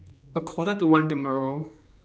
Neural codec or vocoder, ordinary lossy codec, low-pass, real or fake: codec, 16 kHz, 2 kbps, X-Codec, HuBERT features, trained on general audio; none; none; fake